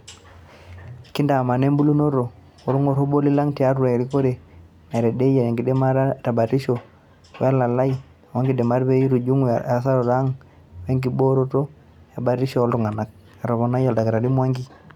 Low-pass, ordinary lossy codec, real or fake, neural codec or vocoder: 19.8 kHz; none; real; none